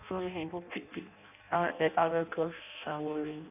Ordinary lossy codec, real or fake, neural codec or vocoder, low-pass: none; fake; codec, 16 kHz in and 24 kHz out, 0.6 kbps, FireRedTTS-2 codec; 3.6 kHz